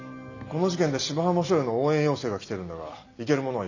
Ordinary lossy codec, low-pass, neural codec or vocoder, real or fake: none; 7.2 kHz; none; real